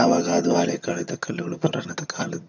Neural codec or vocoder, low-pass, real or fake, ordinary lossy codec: vocoder, 22.05 kHz, 80 mel bands, HiFi-GAN; 7.2 kHz; fake; none